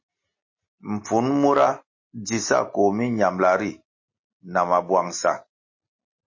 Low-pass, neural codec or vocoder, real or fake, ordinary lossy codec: 7.2 kHz; none; real; MP3, 32 kbps